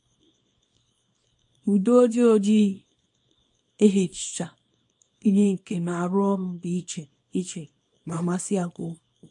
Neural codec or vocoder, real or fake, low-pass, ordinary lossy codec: codec, 24 kHz, 0.9 kbps, WavTokenizer, small release; fake; 10.8 kHz; MP3, 48 kbps